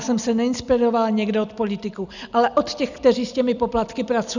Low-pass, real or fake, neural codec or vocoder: 7.2 kHz; real; none